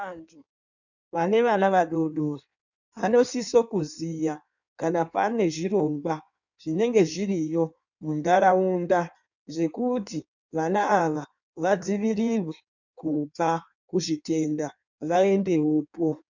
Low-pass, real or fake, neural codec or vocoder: 7.2 kHz; fake; codec, 16 kHz in and 24 kHz out, 1.1 kbps, FireRedTTS-2 codec